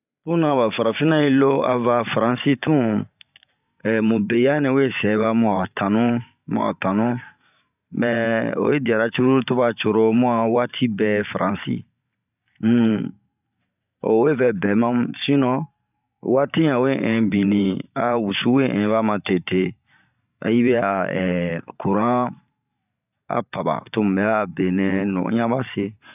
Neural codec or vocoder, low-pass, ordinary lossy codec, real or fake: vocoder, 44.1 kHz, 128 mel bands every 512 samples, BigVGAN v2; 3.6 kHz; none; fake